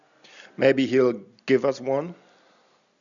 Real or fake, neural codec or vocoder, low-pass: real; none; 7.2 kHz